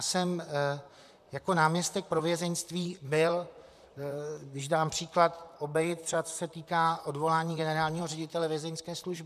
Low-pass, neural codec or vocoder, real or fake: 14.4 kHz; vocoder, 44.1 kHz, 128 mel bands, Pupu-Vocoder; fake